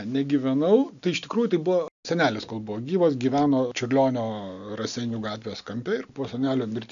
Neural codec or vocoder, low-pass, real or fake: none; 7.2 kHz; real